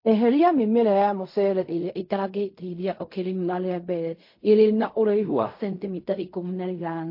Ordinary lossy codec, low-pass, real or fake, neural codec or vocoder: MP3, 32 kbps; 5.4 kHz; fake; codec, 16 kHz in and 24 kHz out, 0.4 kbps, LongCat-Audio-Codec, fine tuned four codebook decoder